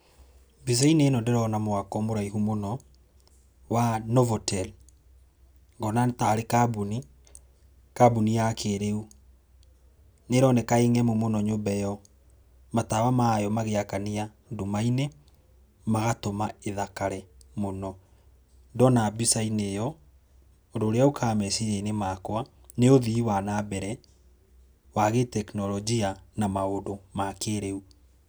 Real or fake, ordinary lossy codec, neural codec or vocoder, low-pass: real; none; none; none